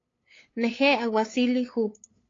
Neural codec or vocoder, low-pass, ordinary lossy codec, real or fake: codec, 16 kHz, 8 kbps, FunCodec, trained on LibriTTS, 25 frames a second; 7.2 kHz; AAC, 32 kbps; fake